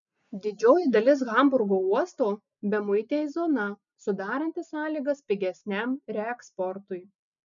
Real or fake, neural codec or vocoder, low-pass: real; none; 7.2 kHz